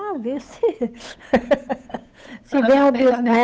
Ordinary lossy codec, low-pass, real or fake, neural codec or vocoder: none; none; fake; codec, 16 kHz, 8 kbps, FunCodec, trained on Chinese and English, 25 frames a second